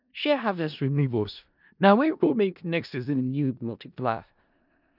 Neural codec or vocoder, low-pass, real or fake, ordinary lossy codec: codec, 16 kHz in and 24 kHz out, 0.4 kbps, LongCat-Audio-Codec, four codebook decoder; 5.4 kHz; fake; none